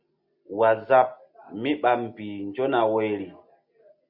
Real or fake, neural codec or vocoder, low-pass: real; none; 5.4 kHz